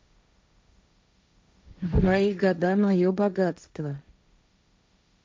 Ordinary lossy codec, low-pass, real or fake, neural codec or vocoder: none; none; fake; codec, 16 kHz, 1.1 kbps, Voila-Tokenizer